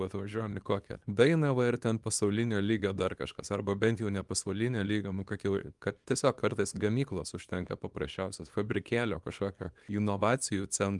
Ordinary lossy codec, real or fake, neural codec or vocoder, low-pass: Opus, 32 kbps; fake; codec, 24 kHz, 0.9 kbps, WavTokenizer, small release; 10.8 kHz